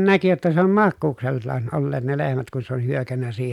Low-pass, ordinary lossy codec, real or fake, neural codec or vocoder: 19.8 kHz; none; real; none